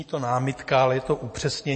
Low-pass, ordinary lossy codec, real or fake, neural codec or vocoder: 10.8 kHz; MP3, 32 kbps; real; none